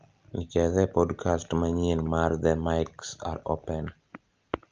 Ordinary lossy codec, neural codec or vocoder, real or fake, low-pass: Opus, 24 kbps; none; real; 7.2 kHz